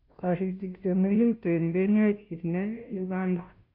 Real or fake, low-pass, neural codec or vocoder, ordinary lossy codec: fake; 5.4 kHz; codec, 16 kHz, 0.5 kbps, FunCodec, trained on Chinese and English, 25 frames a second; MP3, 48 kbps